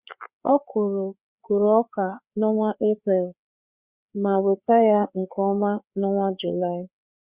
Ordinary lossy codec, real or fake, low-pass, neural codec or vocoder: Opus, 64 kbps; fake; 3.6 kHz; codec, 16 kHz, 4 kbps, FreqCodec, larger model